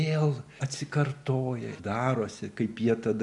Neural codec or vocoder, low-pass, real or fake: none; 10.8 kHz; real